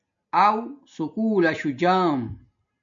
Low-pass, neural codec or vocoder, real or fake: 7.2 kHz; none; real